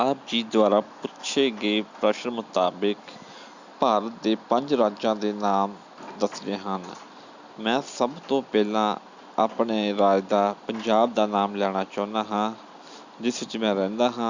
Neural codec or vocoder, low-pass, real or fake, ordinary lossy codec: none; 7.2 kHz; real; Opus, 64 kbps